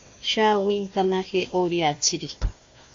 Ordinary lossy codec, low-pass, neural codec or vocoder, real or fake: AAC, 48 kbps; 7.2 kHz; codec, 16 kHz, 1 kbps, FunCodec, trained on Chinese and English, 50 frames a second; fake